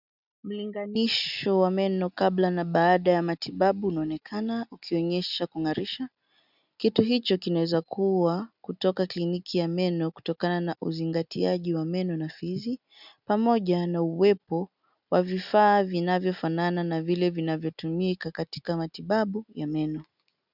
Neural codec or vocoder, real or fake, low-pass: none; real; 5.4 kHz